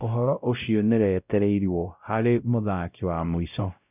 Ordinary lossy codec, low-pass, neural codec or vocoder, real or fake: none; 3.6 kHz; codec, 16 kHz, 0.5 kbps, X-Codec, WavLM features, trained on Multilingual LibriSpeech; fake